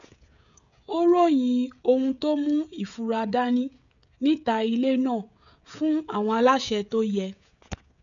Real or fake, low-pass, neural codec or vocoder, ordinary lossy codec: fake; 7.2 kHz; codec, 16 kHz, 16 kbps, FreqCodec, smaller model; none